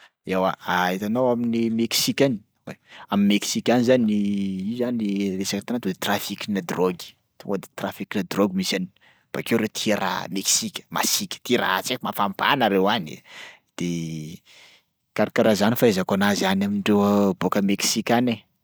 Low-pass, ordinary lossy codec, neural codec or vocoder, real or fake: none; none; none; real